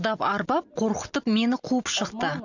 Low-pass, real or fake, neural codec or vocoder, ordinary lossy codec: 7.2 kHz; real; none; none